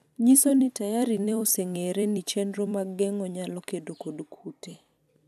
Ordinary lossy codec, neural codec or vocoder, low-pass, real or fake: none; vocoder, 44.1 kHz, 128 mel bands every 256 samples, BigVGAN v2; 14.4 kHz; fake